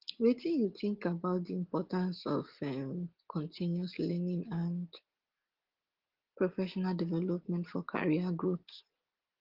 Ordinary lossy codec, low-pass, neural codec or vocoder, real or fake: Opus, 16 kbps; 5.4 kHz; none; real